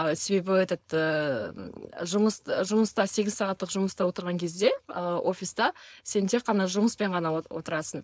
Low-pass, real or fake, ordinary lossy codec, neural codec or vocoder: none; fake; none; codec, 16 kHz, 4.8 kbps, FACodec